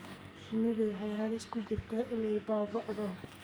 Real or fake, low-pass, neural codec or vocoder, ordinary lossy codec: fake; none; codec, 44.1 kHz, 2.6 kbps, SNAC; none